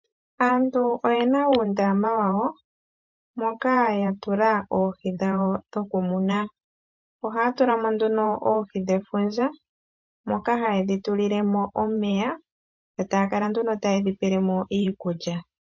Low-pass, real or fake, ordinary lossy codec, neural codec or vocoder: 7.2 kHz; fake; MP3, 48 kbps; vocoder, 44.1 kHz, 128 mel bands every 512 samples, BigVGAN v2